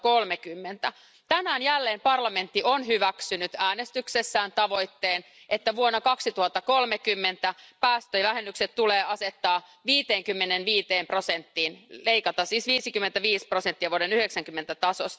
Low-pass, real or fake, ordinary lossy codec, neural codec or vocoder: none; real; none; none